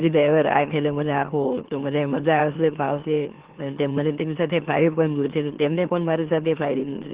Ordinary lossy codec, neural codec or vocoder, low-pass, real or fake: Opus, 16 kbps; autoencoder, 44.1 kHz, a latent of 192 numbers a frame, MeloTTS; 3.6 kHz; fake